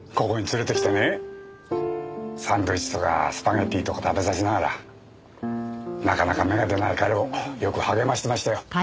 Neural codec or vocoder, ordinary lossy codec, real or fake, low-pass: none; none; real; none